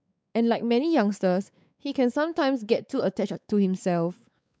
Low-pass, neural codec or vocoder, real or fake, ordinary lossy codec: none; codec, 16 kHz, 4 kbps, X-Codec, WavLM features, trained on Multilingual LibriSpeech; fake; none